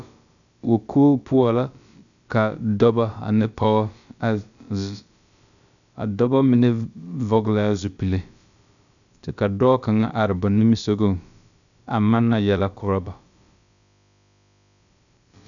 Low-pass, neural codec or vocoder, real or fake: 7.2 kHz; codec, 16 kHz, about 1 kbps, DyCAST, with the encoder's durations; fake